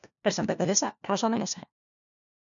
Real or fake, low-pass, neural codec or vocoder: fake; 7.2 kHz; codec, 16 kHz, 1 kbps, FunCodec, trained on LibriTTS, 50 frames a second